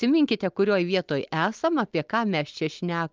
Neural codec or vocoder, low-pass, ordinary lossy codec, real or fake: none; 7.2 kHz; Opus, 24 kbps; real